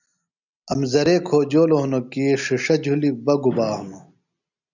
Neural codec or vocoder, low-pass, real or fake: none; 7.2 kHz; real